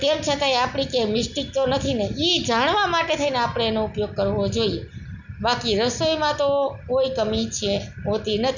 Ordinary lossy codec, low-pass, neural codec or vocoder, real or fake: none; 7.2 kHz; none; real